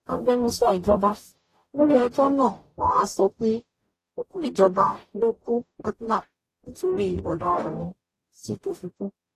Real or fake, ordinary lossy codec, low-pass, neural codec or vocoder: fake; AAC, 48 kbps; 14.4 kHz; codec, 44.1 kHz, 0.9 kbps, DAC